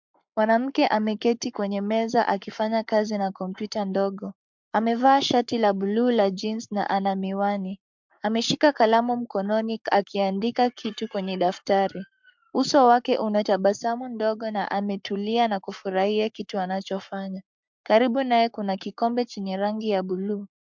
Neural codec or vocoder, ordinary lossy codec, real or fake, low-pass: codec, 44.1 kHz, 7.8 kbps, Pupu-Codec; MP3, 64 kbps; fake; 7.2 kHz